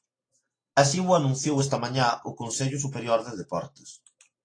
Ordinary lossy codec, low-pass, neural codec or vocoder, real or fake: AAC, 32 kbps; 9.9 kHz; none; real